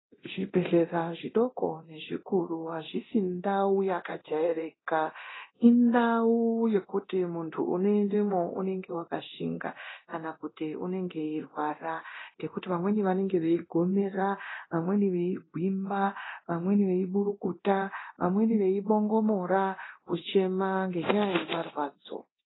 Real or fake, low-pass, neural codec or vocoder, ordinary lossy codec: fake; 7.2 kHz; codec, 24 kHz, 0.9 kbps, DualCodec; AAC, 16 kbps